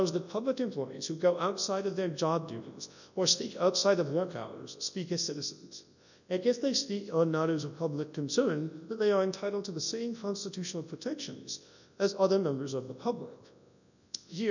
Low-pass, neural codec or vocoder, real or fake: 7.2 kHz; codec, 24 kHz, 0.9 kbps, WavTokenizer, large speech release; fake